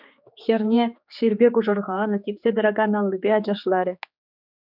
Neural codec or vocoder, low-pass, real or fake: codec, 16 kHz, 4 kbps, X-Codec, HuBERT features, trained on general audio; 5.4 kHz; fake